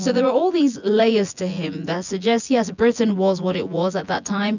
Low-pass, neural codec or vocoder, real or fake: 7.2 kHz; vocoder, 24 kHz, 100 mel bands, Vocos; fake